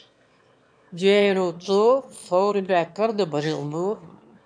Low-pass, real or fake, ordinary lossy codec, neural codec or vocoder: 9.9 kHz; fake; MP3, 96 kbps; autoencoder, 22.05 kHz, a latent of 192 numbers a frame, VITS, trained on one speaker